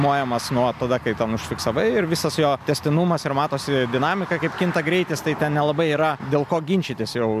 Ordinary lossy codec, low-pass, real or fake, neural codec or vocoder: MP3, 96 kbps; 14.4 kHz; real; none